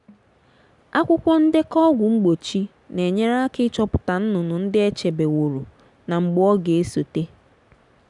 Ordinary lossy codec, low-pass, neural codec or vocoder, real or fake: none; 10.8 kHz; none; real